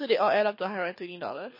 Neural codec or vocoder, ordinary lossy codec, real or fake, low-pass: codec, 24 kHz, 6 kbps, HILCodec; MP3, 24 kbps; fake; 5.4 kHz